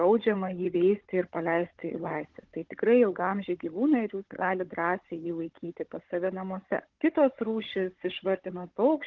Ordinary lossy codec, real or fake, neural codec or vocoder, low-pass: Opus, 16 kbps; fake; codec, 16 kHz, 16 kbps, FunCodec, trained on Chinese and English, 50 frames a second; 7.2 kHz